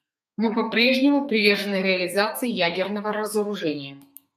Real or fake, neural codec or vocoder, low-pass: fake; codec, 32 kHz, 1.9 kbps, SNAC; 14.4 kHz